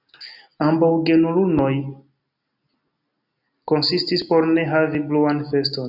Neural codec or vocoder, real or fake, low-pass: none; real; 5.4 kHz